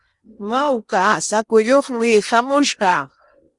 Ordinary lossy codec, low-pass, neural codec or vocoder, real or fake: Opus, 64 kbps; 10.8 kHz; codec, 16 kHz in and 24 kHz out, 0.8 kbps, FocalCodec, streaming, 65536 codes; fake